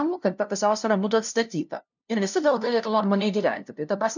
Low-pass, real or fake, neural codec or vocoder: 7.2 kHz; fake; codec, 16 kHz, 0.5 kbps, FunCodec, trained on LibriTTS, 25 frames a second